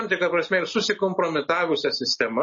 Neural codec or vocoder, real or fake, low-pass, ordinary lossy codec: none; real; 7.2 kHz; MP3, 32 kbps